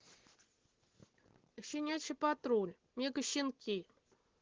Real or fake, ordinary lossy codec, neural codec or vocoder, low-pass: real; Opus, 16 kbps; none; 7.2 kHz